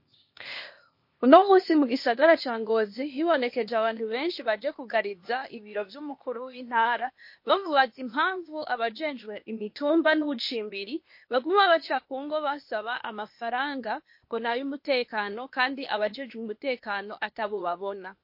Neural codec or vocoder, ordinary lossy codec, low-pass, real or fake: codec, 16 kHz, 0.8 kbps, ZipCodec; MP3, 32 kbps; 5.4 kHz; fake